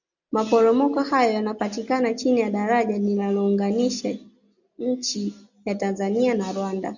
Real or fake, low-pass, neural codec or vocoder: real; 7.2 kHz; none